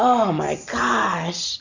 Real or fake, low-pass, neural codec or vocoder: real; 7.2 kHz; none